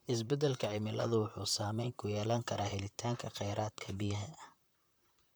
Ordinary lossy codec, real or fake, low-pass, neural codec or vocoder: none; fake; none; vocoder, 44.1 kHz, 128 mel bands, Pupu-Vocoder